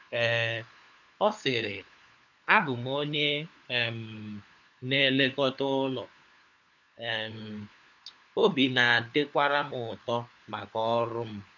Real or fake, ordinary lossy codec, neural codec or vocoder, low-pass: fake; none; codec, 16 kHz, 4 kbps, FunCodec, trained on LibriTTS, 50 frames a second; 7.2 kHz